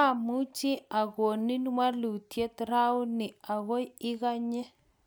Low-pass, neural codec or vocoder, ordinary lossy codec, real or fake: none; none; none; real